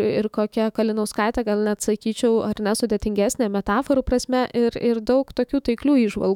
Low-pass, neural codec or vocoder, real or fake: 19.8 kHz; autoencoder, 48 kHz, 128 numbers a frame, DAC-VAE, trained on Japanese speech; fake